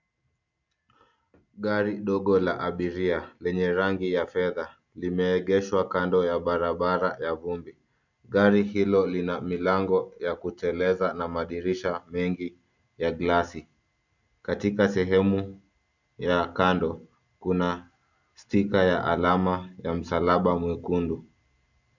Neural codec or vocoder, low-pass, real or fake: none; 7.2 kHz; real